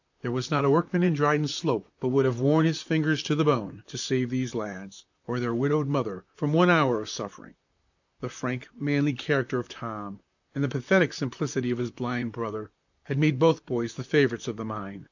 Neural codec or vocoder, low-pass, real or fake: vocoder, 44.1 kHz, 128 mel bands, Pupu-Vocoder; 7.2 kHz; fake